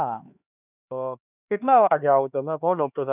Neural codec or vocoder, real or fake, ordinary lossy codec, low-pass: codec, 16 kHz, 2 kbps, X-Codec, HuBERT features, trained on LibriSpeech; fake; none; 3.6 kHz